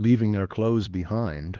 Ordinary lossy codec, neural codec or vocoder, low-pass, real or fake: Opus, 16 kbps; codec, 16 kHz, 2 kbps, X-Codec, HuBERT features, trained on LibriSpeech; 7.2 kHz; fake